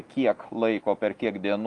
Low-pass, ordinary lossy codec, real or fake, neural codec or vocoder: 10.8 kHz; Opus, 32 kbps; real; none